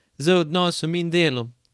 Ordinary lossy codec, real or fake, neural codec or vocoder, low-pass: none; fake; codec, 24 kHz, 0.9 kbps, WavTokenizer, small release; none